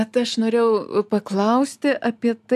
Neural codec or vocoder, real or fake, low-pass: none; real; 14.4 kHz